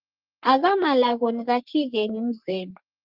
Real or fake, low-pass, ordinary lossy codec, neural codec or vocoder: fake; 5.4 kHz; Opus, 32 kbps; codec, 44.1 kHz, 3.4 kbps, Pupu-Codec